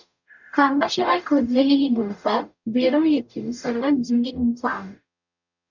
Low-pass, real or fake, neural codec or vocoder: 7.2 kHz; fake; codec, 44.1 kHz, 0.9 kbps, DAC